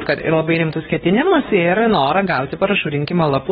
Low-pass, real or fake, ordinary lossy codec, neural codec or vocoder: 7.2 kHz; fake; AAC, 16 kbps; codec, 16 kHz, 2 kbps, X-Codec, WavLM features, trained on Multilingual LibriSpeech